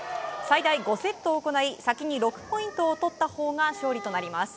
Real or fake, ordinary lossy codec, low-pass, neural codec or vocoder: real; none; none; none